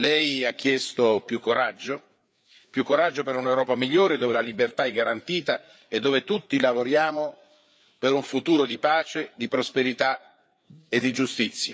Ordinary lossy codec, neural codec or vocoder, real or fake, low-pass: none; codec, 16 kHz, 4 kbps, FreqCodec, larger model; fake; none